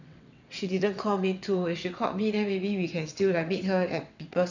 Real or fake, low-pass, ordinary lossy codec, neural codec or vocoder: fake; 7.2 kHz; none; vocoder, 22.05 kHz, 80 mel bands, WaveNeXt